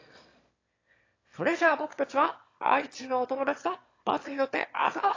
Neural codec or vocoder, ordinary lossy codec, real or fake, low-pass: autoencoder, 22.05 kHz, a latent of 192 numbers a frame, VITS, trained on one speaker; AAC, 32 kbps; fake; 7.2 kHz